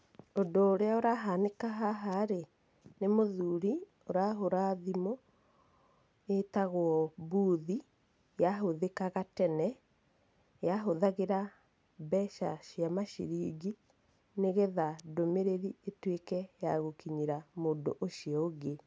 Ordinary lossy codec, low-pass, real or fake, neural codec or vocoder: none; none; real; none